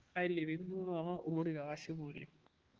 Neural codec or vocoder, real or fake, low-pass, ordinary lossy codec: codec, 16 kHz, 2 kbps, X-Codec, HuBERT features, trained on balanced general audio; fake; 7.2 kHz; Opus, 16 kbps